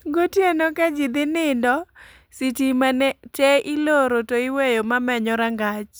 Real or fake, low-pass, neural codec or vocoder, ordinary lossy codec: real; none; none; none